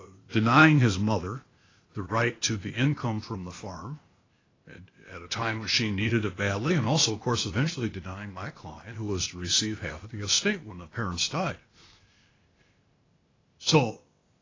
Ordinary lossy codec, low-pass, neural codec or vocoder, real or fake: AAC, 32 kbps; 7.2 kHz; codec, 16 kHz, 0.8 kbps, ZipCodec; fake